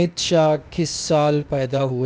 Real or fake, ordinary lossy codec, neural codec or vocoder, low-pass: fake; none; codec, 16 kHz, 0.8 kbps, ZipCodec; none